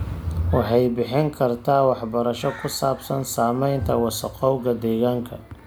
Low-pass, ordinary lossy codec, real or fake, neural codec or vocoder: none; none; real; none